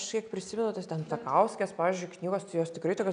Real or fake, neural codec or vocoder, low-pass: real; none; 9.9 kHz